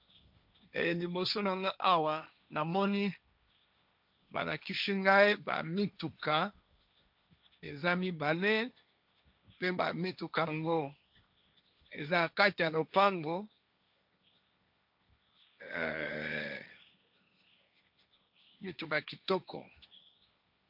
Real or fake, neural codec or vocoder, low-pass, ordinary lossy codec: fake; codec, 16 kHz, 1.1 kbps, Voila-Tokenizer; 5.4 kHz; MP3, 48 kbps